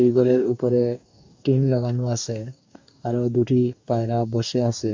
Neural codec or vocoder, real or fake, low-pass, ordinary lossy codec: codec, 44.1 kHz, 2.6 kbps, DAC; fake; 7.2 kHz; MP3, 48 kbps